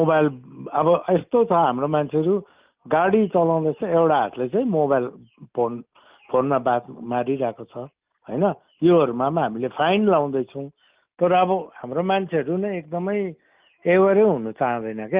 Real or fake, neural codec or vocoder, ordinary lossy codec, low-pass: real; none; Opus, 32 kbps; 3.6 kHz